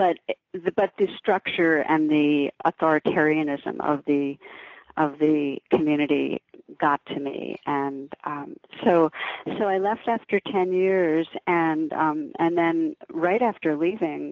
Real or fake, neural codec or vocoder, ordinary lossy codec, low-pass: real; none; AAC, 48 kbps; 7.2 kHz